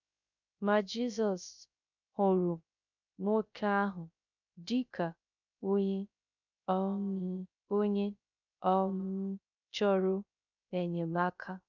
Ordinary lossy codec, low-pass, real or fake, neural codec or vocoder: none; 7.2 kHz; fake; codec, 16 kHz, 0.3 kbps, FocalCodec